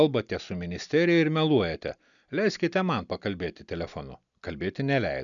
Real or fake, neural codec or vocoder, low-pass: real; none; 7.2 kHz